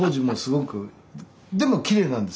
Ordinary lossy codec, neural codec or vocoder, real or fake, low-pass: none; none; real; none